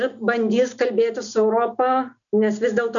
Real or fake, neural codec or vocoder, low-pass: real; none; 7.2 kHz